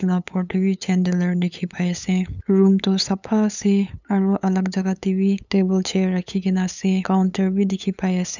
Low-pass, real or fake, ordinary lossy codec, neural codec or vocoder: 7.2 kHz; fake; none; codec, 16 kHz, 8 kbps, FunCodec, trained on LibriTTS, 25 frames a second